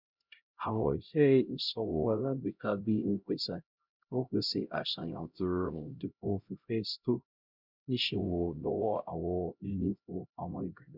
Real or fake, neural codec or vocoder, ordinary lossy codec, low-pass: fake; codec, 16 kHz, 0.5 kbps, X-Codec, HuBERT features, trained on LibriSpeech; Opus, 64 kbps; 5.4 kHz